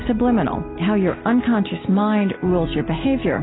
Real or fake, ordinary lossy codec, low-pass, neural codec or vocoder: real; AAC, 16 kbps; 7.2 kHz; none